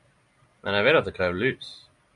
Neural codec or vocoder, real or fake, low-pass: none; real; 10.8 kHz